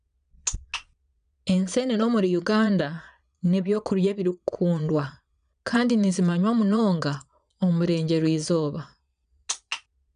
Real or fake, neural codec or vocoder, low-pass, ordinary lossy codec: fake; vocoder, 22.05 kHz, 80 mel bands, Vocos; 9.9 kHz; none